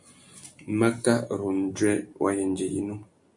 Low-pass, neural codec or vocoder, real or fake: 10.8 kHz; none; real